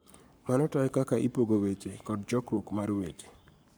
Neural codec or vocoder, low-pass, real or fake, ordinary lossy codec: codec, 44.1 kHz, 7.8 kbps, Pupu-Codec; none; fake; none